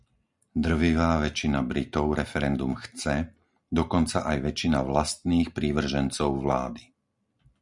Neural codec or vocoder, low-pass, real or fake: none; 10.8 kHz; real